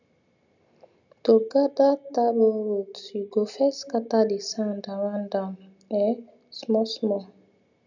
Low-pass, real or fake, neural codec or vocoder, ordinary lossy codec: 7.2 kHz; real; none; none